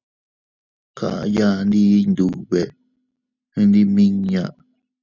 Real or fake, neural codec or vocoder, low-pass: real; none; 7.2 kHz